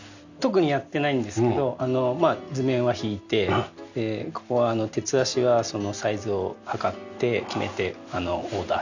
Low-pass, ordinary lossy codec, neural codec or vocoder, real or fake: 7.2 kHz; none; none; real